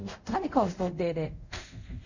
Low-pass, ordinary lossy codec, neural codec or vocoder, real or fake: 7.2 kHz; none; codec, 24 kHz, 0.5 kbps, DualCodec; fake